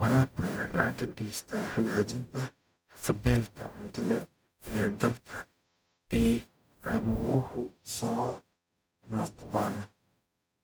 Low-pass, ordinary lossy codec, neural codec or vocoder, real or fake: none; none; codec, 44.1 kHz, 0.9 kbps, DAC; fake